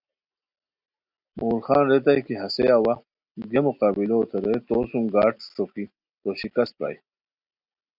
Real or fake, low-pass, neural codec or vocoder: real; 5.4 kHz; none